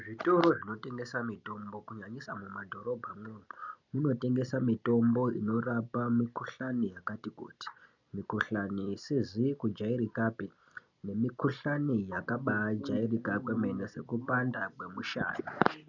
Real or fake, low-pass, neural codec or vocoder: real; 7.2 kHz; none